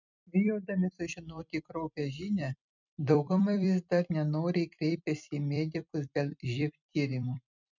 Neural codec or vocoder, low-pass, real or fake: vocoder, 44.1 kHz, 128 mel bands every 256 samples, BigVGAN v2; 7.2 kHz; fake